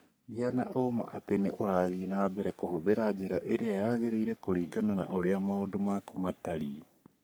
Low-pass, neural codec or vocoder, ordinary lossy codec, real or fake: none; codec, 44.1 kHz, 3.4 kbps, Pupu-Codec; none; fake